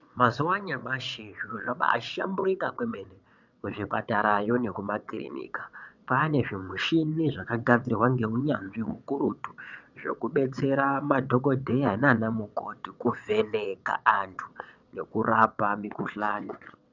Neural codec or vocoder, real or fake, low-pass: vocoder, 22.05 kHz, 80 mel bands, WaveNeXt; fake; 7.2 kHz